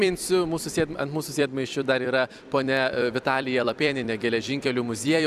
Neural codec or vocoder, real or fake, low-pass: vocoder, 44.1 kHz, 128 mel bands every 256 samples, BigVGAN v2; fake; 14.4 kHz